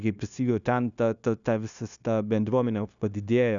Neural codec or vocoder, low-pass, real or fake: codec, 16 kHz, 0.9 kbps, LongCat-Audio-Codec; 7.2 kHz; fake